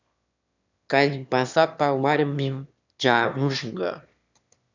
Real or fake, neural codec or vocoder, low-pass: fake; autoencoder, 22.05 kHz, a latent of 192 numbers a frame, VITS, trained on one speaker; 7.2 kHz